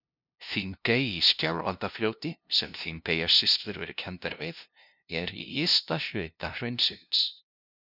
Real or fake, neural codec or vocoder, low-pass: fake; codec, 16 kHz, 0.5 kbps, FunCodec, trained on LibriTTS, 25 frames a second; 5.4 kHz